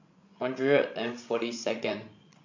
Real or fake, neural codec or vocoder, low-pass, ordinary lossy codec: fake; codec, 16 kHz, 16 kbps, FreqCodec, larger model; 7.2 kHz; MP3, 48 kbps